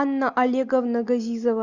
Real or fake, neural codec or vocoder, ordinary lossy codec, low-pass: real; none; Opus, 64 kbps; 7.2 kHz